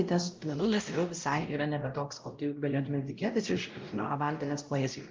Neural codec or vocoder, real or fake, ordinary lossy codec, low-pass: codec, 16 kHz, 0.5 kbps, X-Codec, WavLM features, trained on Multilingual LibriSpeech; fake; Opus, 32 kbps; 7.2 kHz